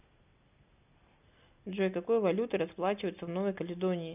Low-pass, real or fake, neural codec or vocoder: 3.6 kHz; real; none